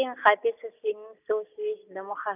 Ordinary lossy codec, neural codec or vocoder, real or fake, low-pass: none; none; real; 3.6 kHz